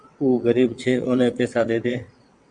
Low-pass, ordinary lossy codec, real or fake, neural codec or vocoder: 9.9 kHz; AAC, 64 kbps; fake; vocoder, 22.05 kHz, 80 mel bands, WaveNeXt